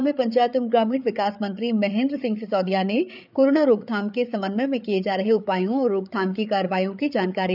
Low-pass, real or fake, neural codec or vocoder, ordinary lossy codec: 5.4 kHz; fake; codec, 16 kHz, 8 kbps, FreqCodec, larger model; none